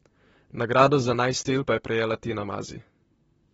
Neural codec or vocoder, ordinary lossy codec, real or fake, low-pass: vocoder, 44.1 kHz, 128 mel bands, Pupu-Vocoder; AAC, 24 kbps; fake; 19.8 kHz